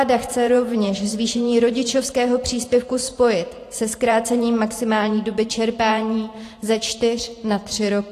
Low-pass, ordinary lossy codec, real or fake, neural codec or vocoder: 14.4 kHz; AAC, 48 kbps; fake; vocoder, 44.1 kHz, 128 mel bands every 512 samples, BigVGAN v2